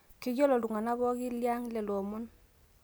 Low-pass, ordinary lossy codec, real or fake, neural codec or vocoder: none; none; real; none